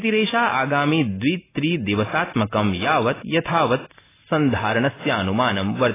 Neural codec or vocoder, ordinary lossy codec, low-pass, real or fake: none; AAC, 16 kbps; 3.6 kHz; real